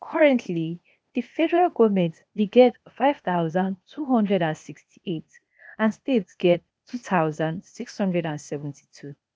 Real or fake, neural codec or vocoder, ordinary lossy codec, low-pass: fake; codec, 16 kHz, 0.8 kbps, ZipCodec; none; none